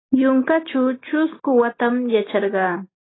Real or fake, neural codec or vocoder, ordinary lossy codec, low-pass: real; none; AAC, 16 kbps; 7.2 kHz